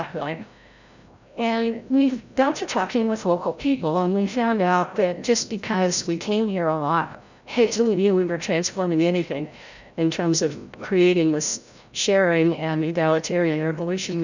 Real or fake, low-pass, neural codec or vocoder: fake; 7.2 kHz; codec, 16 kHz, 0.5 kbps, FreqCodec, larger model